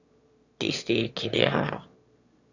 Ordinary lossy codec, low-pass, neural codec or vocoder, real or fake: Opus, 64 kbps; 7.2 kHz; autoencoder, 22.05 kHz, a latent of 192 numbers a frame, VITS, trained on one speaker; fake